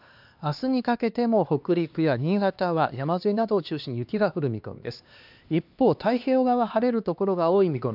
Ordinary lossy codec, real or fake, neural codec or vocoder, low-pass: AAC, 48 kbps; fake; codec, 16 kHz, 2 kbps, X-Codec, HuBERT features, trained on LibriSpeech; 5.4 kHz